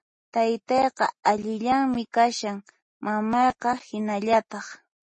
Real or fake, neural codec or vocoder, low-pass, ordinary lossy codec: real; none; 9.9 kHz; MP3, 32 kbps